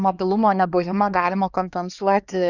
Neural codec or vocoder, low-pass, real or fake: codec, 16 kHz, 2 kbps, X-Codec, HuBERT features, trained on LibriSpeech; 7.2 kHz; fake